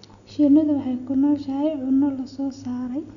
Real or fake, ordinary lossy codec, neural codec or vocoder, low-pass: real; none; none; 7.2 kHz